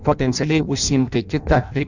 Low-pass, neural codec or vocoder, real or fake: 7.2 kHz; codec, 16 kHz in and 24 kHz out, 0.6 kbps, FireRedTTS-2 codec; fake